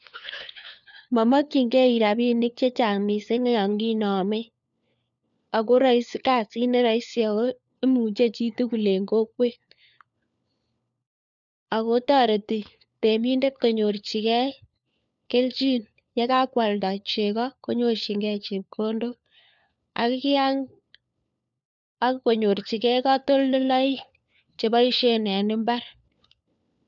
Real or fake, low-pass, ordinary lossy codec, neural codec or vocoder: fake; 7.2 kHz; none; codec, 16 kHz, 4 kbps, FunCodec, trained on LibriTTS, 50 frames a second